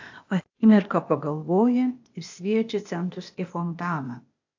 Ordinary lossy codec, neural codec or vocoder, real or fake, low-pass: AAC, 48 kbps; codec, 16 kHz, 0.8 kbps, ZipCodec; fake; 7.2 kHz